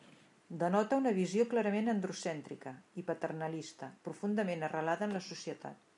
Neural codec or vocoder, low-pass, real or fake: none; 10.8 kHz; real